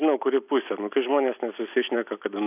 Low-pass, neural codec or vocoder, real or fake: 3.6 kHz; none; real